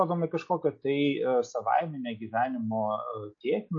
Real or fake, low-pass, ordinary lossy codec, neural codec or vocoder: real; 7.2 kHz; MP3, 32 kbps; none